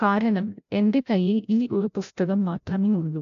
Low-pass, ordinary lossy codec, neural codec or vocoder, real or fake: 7.2 kHz; none; codec, 16 kHz, 0.5 kbps, FreqCodec, larger model; fake